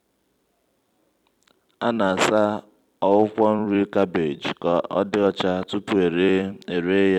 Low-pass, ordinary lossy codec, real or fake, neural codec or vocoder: 19.8 kHz; none; fake; vocoder, 44.1 kHz, 128 mel bands every 256 samples, BigVGAN v2